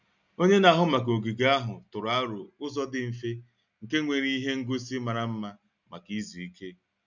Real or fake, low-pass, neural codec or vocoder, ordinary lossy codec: real; 7.2 kHz; none; none